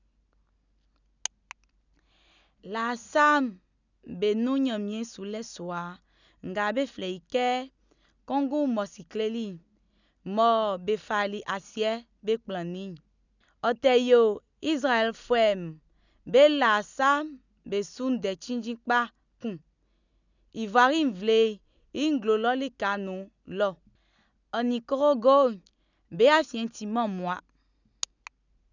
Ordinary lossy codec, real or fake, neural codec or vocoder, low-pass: none; real; none; 7.2 kHz